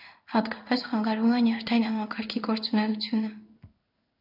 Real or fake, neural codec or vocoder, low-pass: fake; codec, 16 kHz in and 24 kHz out, 1 kbps, XY-Tokenizer; 5.4 kHz